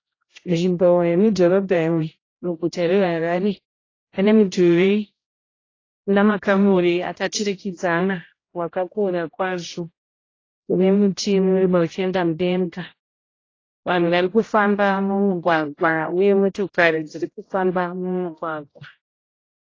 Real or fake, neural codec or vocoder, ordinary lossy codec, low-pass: fake; codec, 16 kHz, 0.5 kbps, X-Codec, HuBERT features, trained on general audio; AAC, 32 kbps; 7.2 kHz